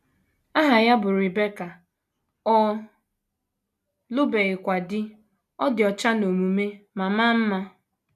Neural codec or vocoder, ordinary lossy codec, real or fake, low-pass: none; none; real; 14.4 kHz